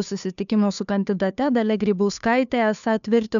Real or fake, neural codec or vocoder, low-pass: fake; codec, 16 kHz, 2 kbps, FunCodec, trained on Chinese and English, 25 frames a second; 7.2 kHz